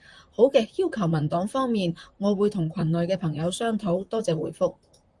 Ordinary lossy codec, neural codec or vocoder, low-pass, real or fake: Opus, 32 kbps; vocoder, 44.1 kHz, 128 mel bands, Pupu-Vocoder; 10.8 kHz; fake